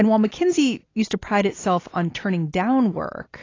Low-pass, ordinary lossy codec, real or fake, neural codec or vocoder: 7.2 kHz; AAC, 32 kbps; real; none